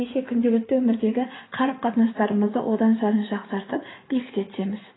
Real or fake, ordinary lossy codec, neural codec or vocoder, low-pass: fake; AAC, 16 kbps; codec, 16 kHz in and 24 kHz out, 2.2 kbps, FireRedTTS-2 codec; 7.2 kHz